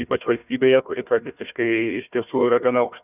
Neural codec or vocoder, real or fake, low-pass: codec, 16 kHz, 1 kbps, FunCodec, trained on Chinese and English, 50 frames a second; fake; 3.6 kHz